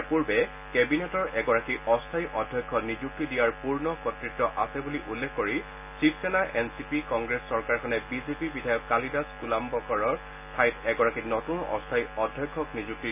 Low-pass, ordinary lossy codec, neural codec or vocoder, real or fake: 3.6 kHz; none; none; real